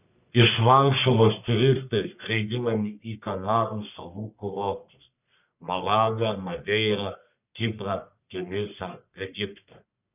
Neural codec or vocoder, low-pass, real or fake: codec, 44.1 kHz, 1.7 kbps, Pupu-Codec; 3.6 kHz; fake